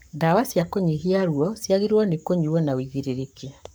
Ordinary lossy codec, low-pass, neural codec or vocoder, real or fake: none; none; codec, 44.1 kHz, 7.8 kbps, Pupu-Codec; fake